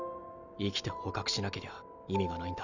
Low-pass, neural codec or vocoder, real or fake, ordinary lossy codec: 7.2 kHz; none; real; none